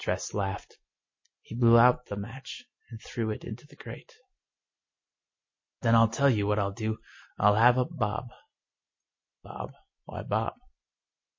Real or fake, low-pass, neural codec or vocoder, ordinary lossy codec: real; 7.2 kHz; none; MP3, 32 kbps